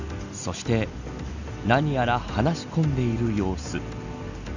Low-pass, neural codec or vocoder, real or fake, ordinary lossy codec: 7.2 kHz; none; real; none